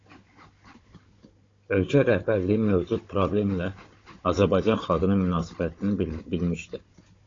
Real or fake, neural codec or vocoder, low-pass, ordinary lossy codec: fake; codec, 16 kHz, 16 kbps, FunCodec, trained on Chinese and English, 50 frames a second; 7.2 kHz; AAC, 32 kbps